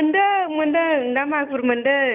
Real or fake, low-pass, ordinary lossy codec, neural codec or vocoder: real; 3.6 kHz; none; none